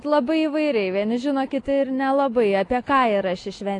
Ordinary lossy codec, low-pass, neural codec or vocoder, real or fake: AAC, 48 kbps; 10.8 kHz; none; real